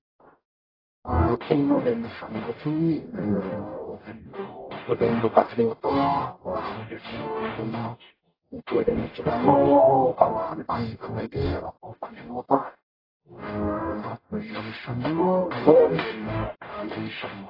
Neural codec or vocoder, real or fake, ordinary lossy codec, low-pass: codec, 44.1 kHz, 0.9 kbps, DAC; fake; AAC, 24 kbps; 5.4 kHz